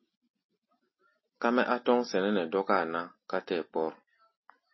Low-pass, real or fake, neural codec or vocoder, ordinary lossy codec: 7.2 kHz; real; none; MP3, 24 kbps